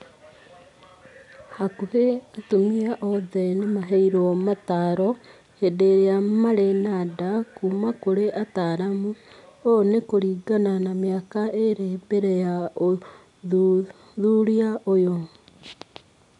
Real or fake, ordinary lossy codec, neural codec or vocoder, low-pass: real; none; none; 10.8 kHz